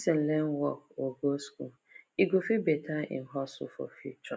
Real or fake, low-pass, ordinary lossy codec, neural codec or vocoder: real; none; none; none